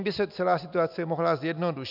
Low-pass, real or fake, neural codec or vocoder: 5.4 kHz; real; none